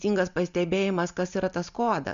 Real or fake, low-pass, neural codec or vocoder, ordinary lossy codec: real; 7.2 kHz; none; Opus, 64 kbps